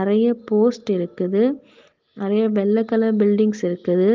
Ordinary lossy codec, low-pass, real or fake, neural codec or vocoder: Opus, 24 kbps; 7.2 kHz; real; none